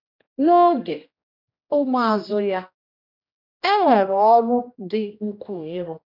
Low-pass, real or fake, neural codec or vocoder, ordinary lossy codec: 5.4 kHz; fake; codec, 16 kHz, 1 kbps, X-Codec, HuBERT features, trained on general audio; none